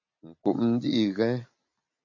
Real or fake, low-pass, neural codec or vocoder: real; 7.2 kHz; none